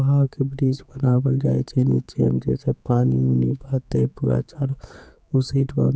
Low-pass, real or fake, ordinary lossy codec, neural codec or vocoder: none; fake; none; codec, 16 kHz, 4 kbps, X-Codec, HuBERT features, trained on general audio